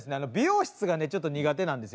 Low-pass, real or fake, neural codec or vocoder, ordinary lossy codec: none; real; none; none